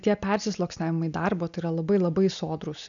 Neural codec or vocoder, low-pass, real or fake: none; 7.2 kHz; real